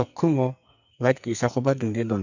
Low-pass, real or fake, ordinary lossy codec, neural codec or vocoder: 7.2 kHz; fake; none; codec, 44.1 kHz, 2.6 kbps, SNAC